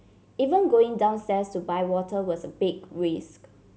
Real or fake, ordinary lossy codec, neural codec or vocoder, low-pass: real; none; none; none